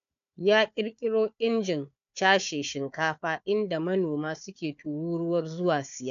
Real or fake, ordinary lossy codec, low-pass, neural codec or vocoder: fake; AAC, 96 kbps; 7.2 kHz; codec, 16 kHz, 4 kbps, FunCodec, trained on Chinese and English, 50 frames a second